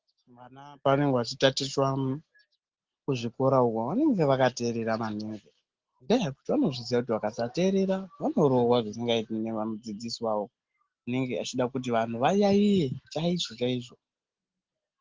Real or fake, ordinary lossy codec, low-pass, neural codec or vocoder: real; Opus, 16 kbps; 7.2 kHz; none